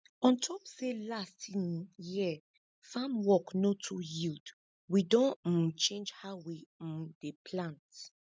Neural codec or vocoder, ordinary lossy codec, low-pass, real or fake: none; none; none; real